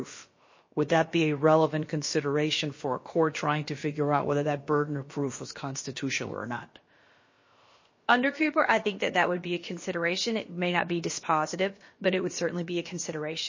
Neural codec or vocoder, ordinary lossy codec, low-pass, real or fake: codec, 16 kHz, about 1 kbps, DyCAST, with the encoder's durations; MP3, 32 kbps; 7.2 kHz; fake